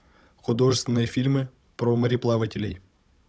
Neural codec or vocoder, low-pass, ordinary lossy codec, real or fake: codec, 16 kHz, 16 kbps, FunCodec, trained on Chinese and English, 50 frames a second; none; none; fake